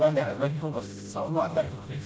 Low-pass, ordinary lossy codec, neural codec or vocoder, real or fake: none; none; codec, 16 kHz, 0.5 kbps, FreqCodec, smaller model; fake